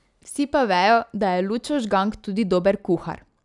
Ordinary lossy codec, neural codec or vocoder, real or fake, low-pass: none; none; real; 10.8 kHz